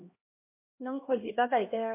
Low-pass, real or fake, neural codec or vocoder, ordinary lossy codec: 3.6 kHz; fake; codec, 16 kHz, 1 kbps, X-Codec, HuBERT features, trained on LibriSpeech; MP3, 24 kbps